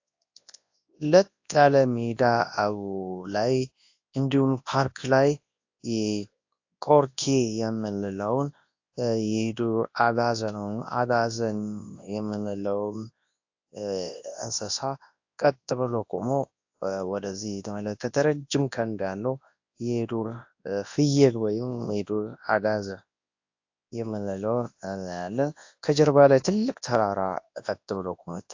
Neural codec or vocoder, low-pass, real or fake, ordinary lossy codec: codec, 24 kHz, 0.9 kbps, WavTokenizer, large speech release; 7.2 kHz; fake; AAC, 48 kbps